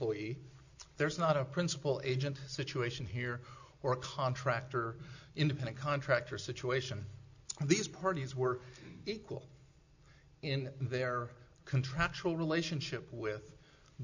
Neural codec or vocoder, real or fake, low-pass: none; real; 7.2 kHz